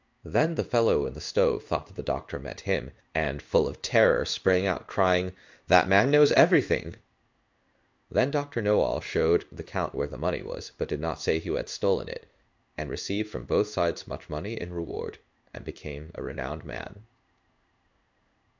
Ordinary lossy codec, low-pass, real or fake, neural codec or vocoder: MP3, 64 kbps; 7.2 kHz; fake; codec, 16 kHz in and 24 kHz out, 1 kbps, XY-Tokenizer